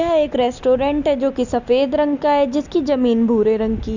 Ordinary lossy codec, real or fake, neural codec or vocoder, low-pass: none; real; none; 7.2 kHz